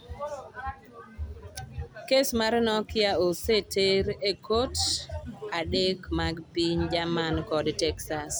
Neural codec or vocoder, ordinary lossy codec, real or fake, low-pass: none; none; real; none